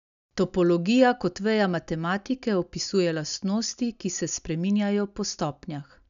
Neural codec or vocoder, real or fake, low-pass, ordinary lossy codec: none; real; 7.2 kHz; none